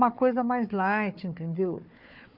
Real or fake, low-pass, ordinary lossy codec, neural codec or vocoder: fake; 5.4 kHz; none; codec, 16 kHz, 4 kbps, FunCodec, trained on Chinese and English, 50 frames a second